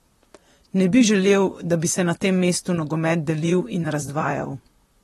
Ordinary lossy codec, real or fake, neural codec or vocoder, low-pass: AAC, 32 kbps; fake; vocoder, 44.1 kHz, 128 mel bands, Pupu-Vocoder; 19.8 kHz